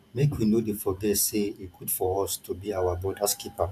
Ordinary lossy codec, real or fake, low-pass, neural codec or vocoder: none; fake; 14.4 kHz; vocoder, 44.1 kHz, 128 mel bands every 512 samples, BigVGAN v2